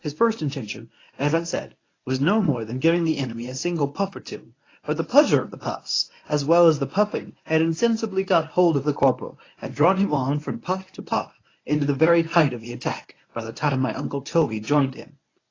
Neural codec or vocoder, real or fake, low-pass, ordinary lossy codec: codec, 24 kHz, 0.9 kbps, WavTokenizer, medium speech release version 1; fake; 7.2 kHz; AAC, 32 kbps